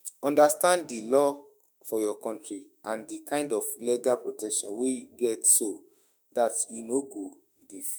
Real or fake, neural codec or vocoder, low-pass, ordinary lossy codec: fake; autoencoder, 48 kHz, 32 numbers a frame, DAC-VAE, trained on Japanese speech; none; none